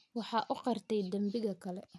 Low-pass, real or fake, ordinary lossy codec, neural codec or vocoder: none; real; none; none